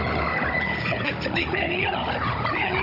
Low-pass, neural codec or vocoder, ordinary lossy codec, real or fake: 5.4 kHz; codec, 16 kHz, 16 kbps, FunCodec, trained on Chinese and English, 50 frames a second; none; fake